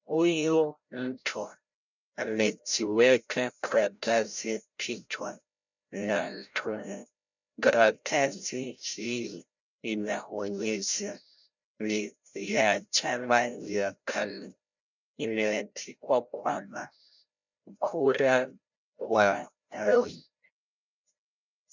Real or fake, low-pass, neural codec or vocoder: fake; 7.2 kHz; codec, 16 kHz, 0.5 kbps, FreqCodec, larger model